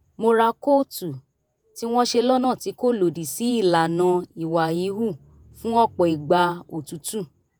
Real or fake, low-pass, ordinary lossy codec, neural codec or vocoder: fake; none; none; vocoder, 48 kHz, 128 mel bands, Vocos